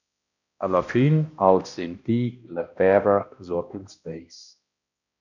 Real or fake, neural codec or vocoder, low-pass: fake; codec, 16 kHz, 1 kbps, X-Codec, HuBERT features, trained on balanced general audio; 7.2 kHz